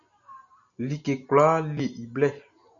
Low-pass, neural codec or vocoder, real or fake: 7.2 kHz; none; real